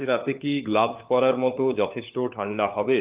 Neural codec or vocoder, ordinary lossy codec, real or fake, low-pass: codec, 16 kHz, 4 kbps, FunCodec, trained on LibriTTS, 50 frames a second; Opus, 24 kbps; fake; 3.6 kHz